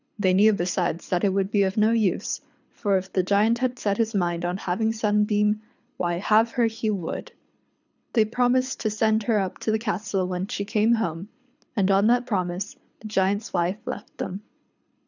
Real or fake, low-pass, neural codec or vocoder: fake; 7.2 kHz; codec, 24 kHz, 6 kbps, HILCodec